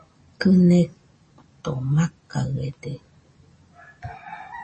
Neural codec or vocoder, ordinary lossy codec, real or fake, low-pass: none; MP3, 32 kbps; real; 9.9 kHz